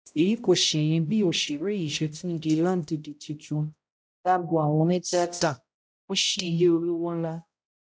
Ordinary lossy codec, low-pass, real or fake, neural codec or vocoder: none; none; fake; codec, 16 kHz, 0.5 kbps, X-Codec, HuBERT features, trained on balanced general audio